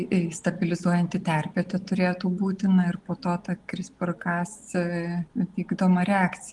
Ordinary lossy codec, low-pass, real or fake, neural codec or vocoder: Opus, 24 kbps; 10.8 kHz; real; none